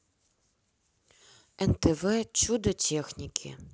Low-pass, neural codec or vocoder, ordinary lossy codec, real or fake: none; none; none; real